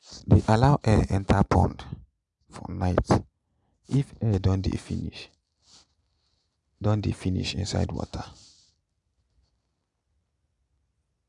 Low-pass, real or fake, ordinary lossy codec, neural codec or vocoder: 10.8 kHz; real; none; none